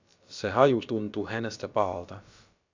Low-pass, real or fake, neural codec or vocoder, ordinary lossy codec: 7.2 kHz; fake; codec, 16 kHz, about 1 kbps, DyCAST, with the encoder's durations; MP3, 64 kbps